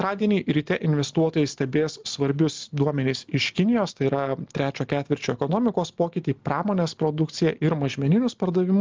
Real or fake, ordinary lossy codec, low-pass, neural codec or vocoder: real; Opus, 32 kbps; 7.2 kHz; none